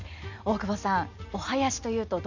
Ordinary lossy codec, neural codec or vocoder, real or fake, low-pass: none; none; real; 7.2 kHz